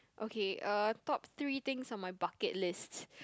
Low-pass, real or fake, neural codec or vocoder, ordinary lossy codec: none; real; none; none